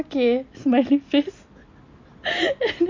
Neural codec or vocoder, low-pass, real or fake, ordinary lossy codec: none; 7.2 kHz; real; MP3, 48 kbps